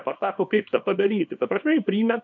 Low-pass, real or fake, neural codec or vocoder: 7.2 kHz; fake; codec, 24 kHz, 0.9 kbps, WavTokenizer, small release